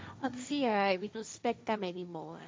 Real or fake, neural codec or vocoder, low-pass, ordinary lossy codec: fake; codec, 16 kHz, 1.1 kbps, Voila-Tokenizer; none; none